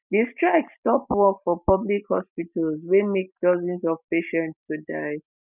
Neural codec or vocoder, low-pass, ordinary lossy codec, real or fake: none; 3.6 kHz; none; real